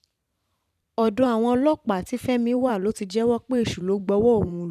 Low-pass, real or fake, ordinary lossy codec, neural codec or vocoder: 14.4 kHz; fake; none; vocoder, 44.1 kHz, 128 mel bands every 512 samples, BigVGAN v2